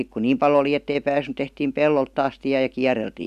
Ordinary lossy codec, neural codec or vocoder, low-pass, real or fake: none; none; 14.4 kHz; real